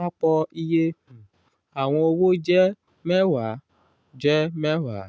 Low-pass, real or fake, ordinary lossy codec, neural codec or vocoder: none; real; none; none